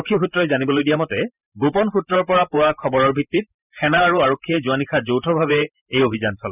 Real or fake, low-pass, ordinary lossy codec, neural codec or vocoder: fake; 3.6 kHz; none; vocoder, 44.1 kHz, 128 mel bands every 512 samples, BigVGAN v2